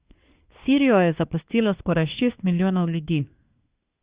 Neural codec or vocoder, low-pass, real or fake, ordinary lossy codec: codec, 24 kHz, 1 kbps, SNAC; 3.6 kHz; fake; Opus, 64 kbps